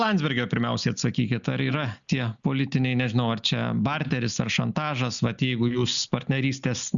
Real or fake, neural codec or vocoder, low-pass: real; none; 7.2 kHz